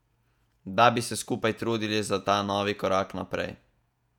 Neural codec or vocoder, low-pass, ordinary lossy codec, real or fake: vocoder, 48 kHz, 128 mel bands, Vocos; 19.8 kHz; none; fake